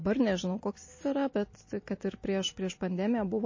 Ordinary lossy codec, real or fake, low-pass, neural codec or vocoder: MP3, 32 kbps; real; 7.2 kHz; none